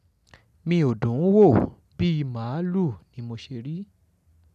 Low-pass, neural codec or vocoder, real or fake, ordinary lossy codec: 14.4 kHz; none; real; none